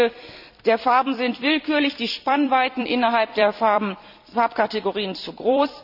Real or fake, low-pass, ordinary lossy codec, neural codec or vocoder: fake; 5.4 kHz; none; vocoder, 44.1 kHz, 128 mel bands every 256 samples, BigVGAN v2